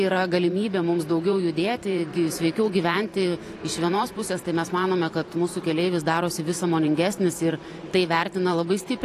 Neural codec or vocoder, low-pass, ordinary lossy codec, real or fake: vocoder, 44.1 kHz, 128 mel bands every 512 samples, BigVGAN v2; 14.4 kHz; AAC, 48 kbps; fake